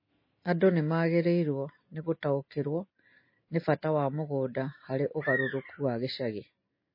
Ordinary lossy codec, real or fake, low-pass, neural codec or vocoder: MP3, 24 kbps; real; 5.4 kHz; none